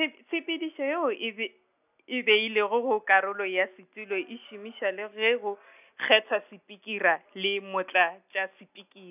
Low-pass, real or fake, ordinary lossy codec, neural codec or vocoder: 3.6 kHz; real; none; none